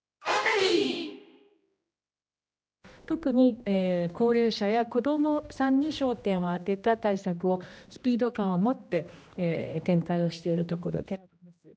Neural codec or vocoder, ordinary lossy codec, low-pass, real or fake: codec, 16 kHz, 1 kbps, X-Codec, HuBERT features, trained on general audio; none; none; fake